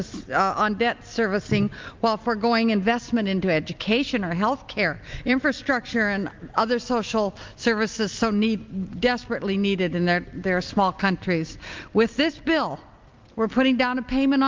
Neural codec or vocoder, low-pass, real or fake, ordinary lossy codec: none; 7.2 kHz; real; Opus, 32 kbps